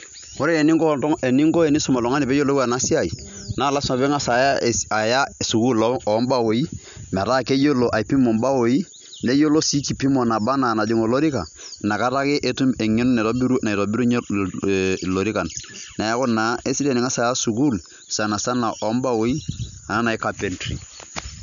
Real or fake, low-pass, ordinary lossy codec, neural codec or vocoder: real; 7.2 kHz; none; none